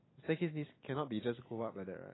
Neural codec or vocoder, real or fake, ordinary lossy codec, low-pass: none; real; AAC, 16 kbps; 7.2 kHz